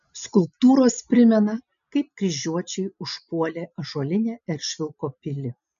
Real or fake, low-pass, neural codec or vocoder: real; 7.2 kHz; none